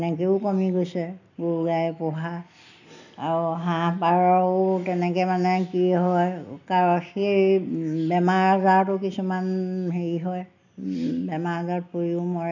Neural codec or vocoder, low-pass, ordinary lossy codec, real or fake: none; 7.2 kHz; none; real